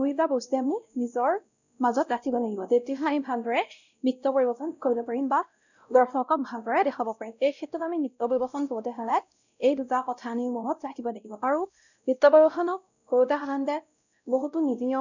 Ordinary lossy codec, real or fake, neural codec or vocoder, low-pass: none; fake; codec, 16 kHz, 0.5 kbps, X-Codec, WavLM features, trained on Multilingual LibriSpeech; 7.2 kHz